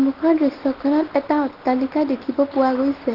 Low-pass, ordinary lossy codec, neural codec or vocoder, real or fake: 5.4 kHz; Opus, 16 kbps; none; real